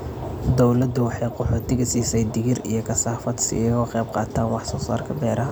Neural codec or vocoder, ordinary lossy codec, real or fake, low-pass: none; none; real; none